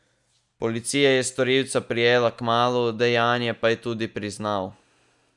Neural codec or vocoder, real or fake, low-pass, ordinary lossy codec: none; real; 10.8 kHz; none